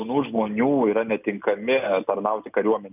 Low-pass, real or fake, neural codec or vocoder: 3.6 kHz; real; none